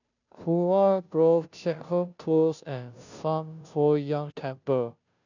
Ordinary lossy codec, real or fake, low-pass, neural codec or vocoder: none; fake; 7.2 kHz; codec, 16 kHz, 0.5 kbps, FunCodec, trained on Chinese and English, 25 frames a second